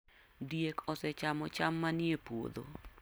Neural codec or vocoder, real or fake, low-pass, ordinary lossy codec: none; real; none; none